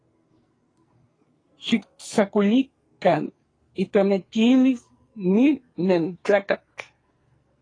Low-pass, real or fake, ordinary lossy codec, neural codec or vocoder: 9.9 kHz; fake; AAC, 32 kbps; codec, 44.1 kHz, 2.6 kbps, SNAC